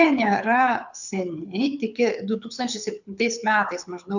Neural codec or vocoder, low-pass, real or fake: codec, 24 kHz, 6 kbps, HILCodec; 7.2 kHz; fake